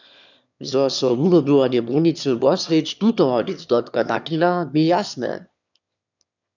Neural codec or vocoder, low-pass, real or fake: autoencoder, 22.05 kHz, a latent of 192 numbers a frame, VITS, trained on one speaker; 7.2 kHz; fake